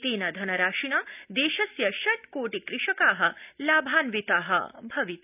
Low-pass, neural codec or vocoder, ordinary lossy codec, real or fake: 3.6 kHz; none; none; real